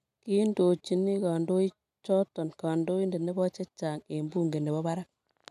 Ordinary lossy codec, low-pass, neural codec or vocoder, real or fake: none; 14.4 kHz; none; real